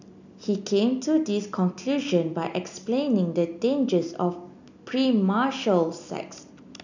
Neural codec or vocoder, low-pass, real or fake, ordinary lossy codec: none; 7.2 kHz; real; none